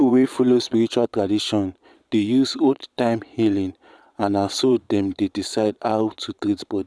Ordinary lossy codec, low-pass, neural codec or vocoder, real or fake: none; none; vocoder, 22.05 kHz, 80 mel bands, Vocos; fake